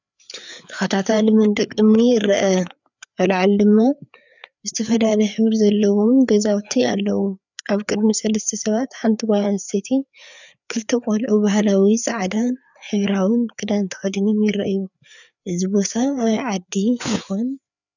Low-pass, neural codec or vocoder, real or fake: 7.2 kHz; codec, 16 kHz, 4 kbps, FreqCodec, larger model; fake